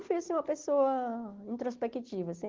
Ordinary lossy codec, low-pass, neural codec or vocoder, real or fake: Opus, 16 kbps; 7.2 kHz; none; real